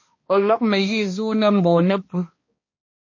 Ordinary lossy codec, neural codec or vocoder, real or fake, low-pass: MP3, 32 kbps; codec, 16 kHz, 1 kbps, X-Codec, HuBERT features, trained on balanced general audio; fake; 7.2 kHz